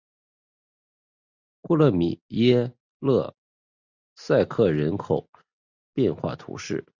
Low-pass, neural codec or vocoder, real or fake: 7.2 kHz; none; real